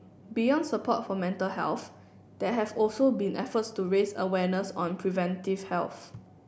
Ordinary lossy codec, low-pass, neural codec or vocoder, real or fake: none; none; none; real